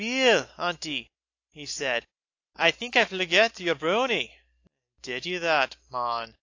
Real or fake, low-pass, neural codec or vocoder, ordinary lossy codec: real; 7.2 kHz; none; AAC, 48 kbps